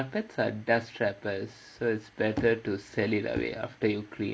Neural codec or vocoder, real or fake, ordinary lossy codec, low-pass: none; real; none; none